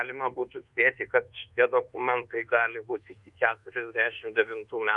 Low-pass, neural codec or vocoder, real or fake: 10.8 kHz; codec, 24 kHz, 1.2 kbps, DualCodec; fake